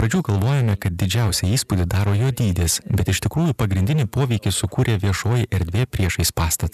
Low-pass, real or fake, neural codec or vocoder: 14.4 kHz; real; none